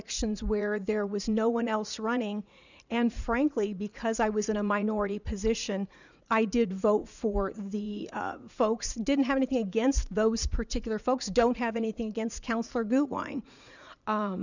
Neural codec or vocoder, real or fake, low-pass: vocoder, 44.1 kHz, 80 mel bands, Vocos; fake; 7.2 kHz